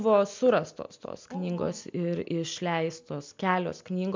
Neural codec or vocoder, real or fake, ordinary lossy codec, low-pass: none; real; MP3, 64 kbps; 7.2 kHz